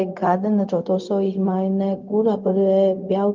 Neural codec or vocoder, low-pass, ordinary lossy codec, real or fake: codec, 16 kHz, 0.4 kbps, LongCat-Audio-Codec; 7.2 kHz; Opus, 32 kbps; fake